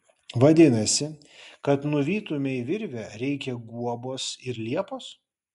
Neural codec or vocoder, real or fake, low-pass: none; real; 10.8 kHz